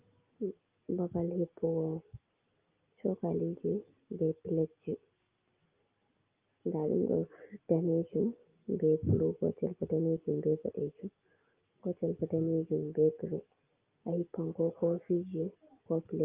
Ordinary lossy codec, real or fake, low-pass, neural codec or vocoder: Opus, 24 kbps; real; 3.6 kHz; none